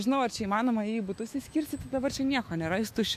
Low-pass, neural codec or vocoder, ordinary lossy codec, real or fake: 14.4 kHz; autoencoder, 48 kHz, 128 numbers a frame, DAC-VAE, trained on Japanese speech; MP3, 64 kbps; fake